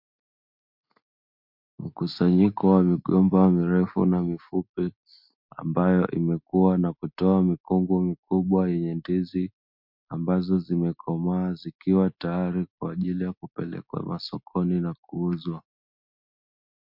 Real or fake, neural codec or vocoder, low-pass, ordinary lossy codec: real; none; 5.4 kHz; MP3, 48 kbps